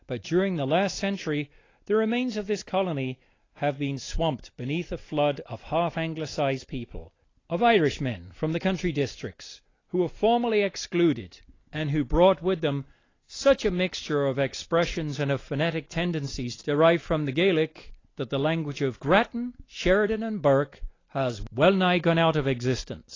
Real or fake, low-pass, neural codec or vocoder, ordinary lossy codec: real; 7.2 kHz; none; AAC, 32 kbps